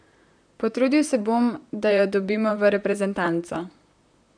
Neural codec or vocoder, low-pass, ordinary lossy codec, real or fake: vocoder, 44.1 kHz, 128 mel bands, Pupu-Vocoder; 9.9 kHz; none; fake